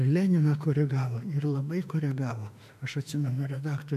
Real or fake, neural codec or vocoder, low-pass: fake; autoencoder, 48 kHz, 32 numbers a frame, DAC-VAE, trained on Japanese speech; 14.4 kHz